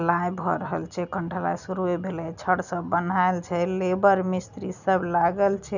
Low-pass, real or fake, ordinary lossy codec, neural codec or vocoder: 7.2 kHz; real; none; none